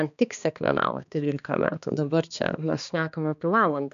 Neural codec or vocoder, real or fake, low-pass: codec, 16 kHz, 2 kbps, X-Codec, HuBERT features, trained on balanced general audio; fake; 7.2 kHz